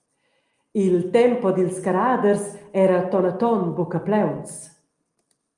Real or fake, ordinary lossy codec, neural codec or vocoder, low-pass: real; Opus, 32 kbps; none; 10.8 kHz